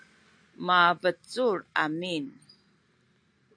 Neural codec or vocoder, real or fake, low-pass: none; real; 9.9 kHz